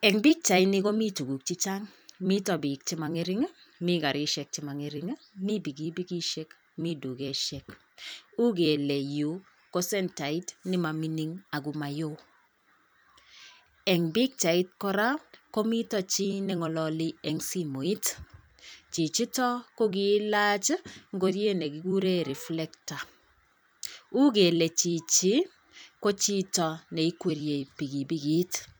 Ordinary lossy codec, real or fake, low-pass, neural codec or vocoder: none; fake; none; vocoder, 44.1 kHz, 128 mel bands every 256 samples, BigVGAN v2